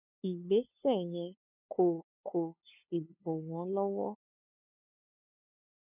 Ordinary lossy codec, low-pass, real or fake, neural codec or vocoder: none; 3.6 kHz; fake; codec, 16 kHz, 2 kbps, FunCodec, trained on LibriTTS, 25 frames a second